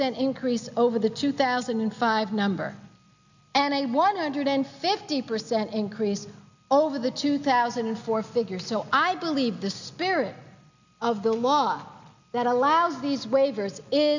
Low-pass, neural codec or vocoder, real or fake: 7.2 kHz; none; real